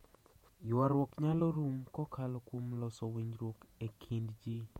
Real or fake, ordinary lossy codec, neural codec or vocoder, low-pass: fake; MP3, 64 kbps; vocoder, 48 kHz, 128 mel bands, Vocos; 19.8 kHz